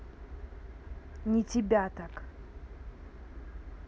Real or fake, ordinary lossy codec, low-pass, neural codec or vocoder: real; none; none; none